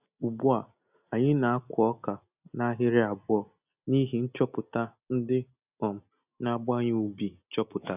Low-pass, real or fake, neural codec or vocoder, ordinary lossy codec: 3.6 kHz; real; none; none